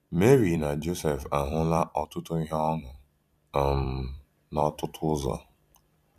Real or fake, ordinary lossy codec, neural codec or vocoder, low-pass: fake; none; vocoder, 44.1 kHz, 128 mel bands every 256 samples, BigVGAN v2; 14.4 kHz